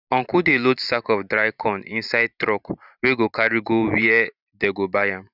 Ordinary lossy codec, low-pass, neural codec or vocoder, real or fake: none; 5.4 kHz; vocoder, 44.1 kHz, 128 mel bands every 512 samples, BigVGAN v2; fake